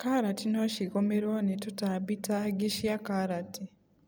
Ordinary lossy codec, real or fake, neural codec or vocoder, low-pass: none; real; none; none